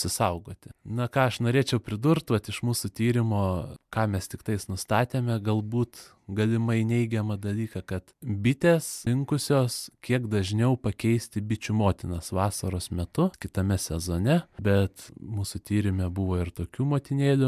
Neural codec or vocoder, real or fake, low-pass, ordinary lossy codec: none; real; 14.4 kHz; MP3, 96 kbps